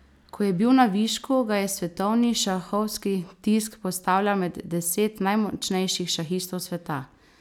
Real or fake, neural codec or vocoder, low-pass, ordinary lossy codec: real; none; 19.8 kHz; none